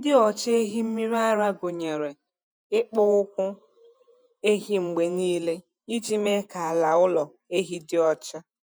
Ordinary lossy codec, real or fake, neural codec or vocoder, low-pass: none; fake; vocoder, 48 kHz, 128 mel bands, Vocos; none